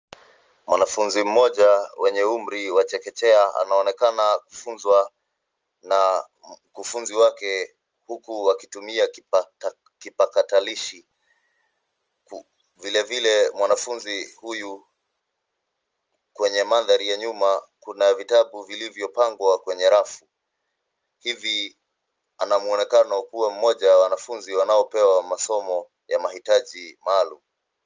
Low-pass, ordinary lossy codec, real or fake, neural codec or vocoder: 7.2 kHz; Opus, 24 kbps; real; none